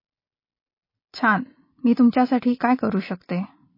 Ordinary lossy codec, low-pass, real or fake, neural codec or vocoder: MP3, 24 kbps; 5.4 kHz; real; none